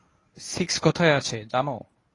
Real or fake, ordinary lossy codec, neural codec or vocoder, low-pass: fake; AAC, 32 kbps; codec, 24 kHz, 0.9 kbps, WavTokenizer, medium speech release version 1; 10.8 kHz